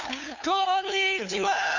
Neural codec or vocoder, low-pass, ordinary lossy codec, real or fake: codec, 16 kHz, 2 kbps, FunCodec, trained on LibriTTS, 25 frames a second; 7.2 kHz; none; fake